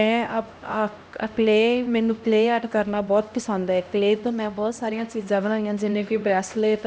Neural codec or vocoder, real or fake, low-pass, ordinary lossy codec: codec, 16 kHz, 1 kbps, X-Codec, HuBERT features, trained on LibriSpeech; fake; none; none